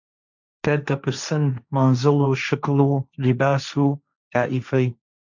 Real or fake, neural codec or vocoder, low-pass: fake; codec, 16 kHz, 1.1 kbps, Voila-Tokenizer; 7.2 kHz